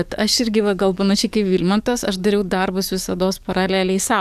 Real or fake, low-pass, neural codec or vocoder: fake; 14.4 kHz; codec, 44.1 kHz, 7.8 kbps, DAC